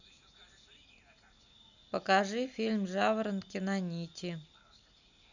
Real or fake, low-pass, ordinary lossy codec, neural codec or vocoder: real; 7.2 kHz; none; none